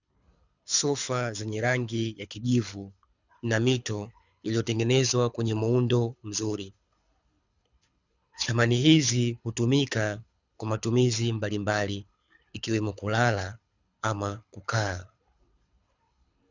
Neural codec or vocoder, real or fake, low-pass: codec, 24 kHz, 6 kbps, HILCodec; fake; 7.2 kHz